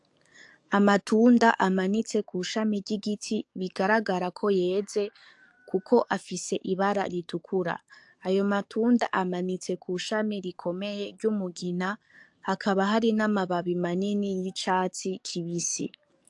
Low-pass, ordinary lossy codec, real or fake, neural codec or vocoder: 10.8 kHz; AAC, 64 kbps; fake; codec, 44.1 kHz, 7.8 kbps, DAC